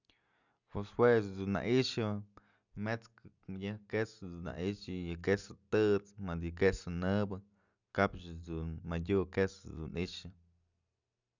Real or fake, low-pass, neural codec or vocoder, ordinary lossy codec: real; 7.2 kHz; none; none